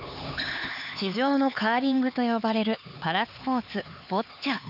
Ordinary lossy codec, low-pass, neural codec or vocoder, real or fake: none; 5.4 kHz; codec, 16 kHz, 4 kbps, X-Codec, HuBERT features, trained on LibriSpeech; fake